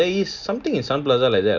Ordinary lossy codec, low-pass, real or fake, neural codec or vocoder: none; 7.2 kHz; real; none